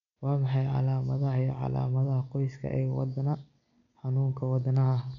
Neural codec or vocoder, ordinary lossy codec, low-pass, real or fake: none; none; 7.2 kHz; real